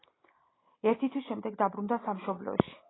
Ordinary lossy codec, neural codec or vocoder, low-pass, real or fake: AAC, 16 kbps; none; 7.2 kHz; real